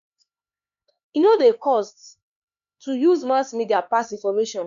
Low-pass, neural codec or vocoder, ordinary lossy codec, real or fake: 7.2 kHz; codec, 16 kHz, 4 kbps, X-Codec, HuBERT features, trained on LibriSpeech; none; fake